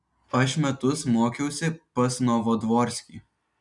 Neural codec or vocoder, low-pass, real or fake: none; 10.8 kHz; real